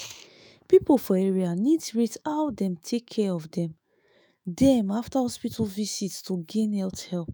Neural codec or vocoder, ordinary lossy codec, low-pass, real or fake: autoencoder, 48 kHz, 128 numbers a frame, DAC-VAE, trained on Japanese speech; none; none; fake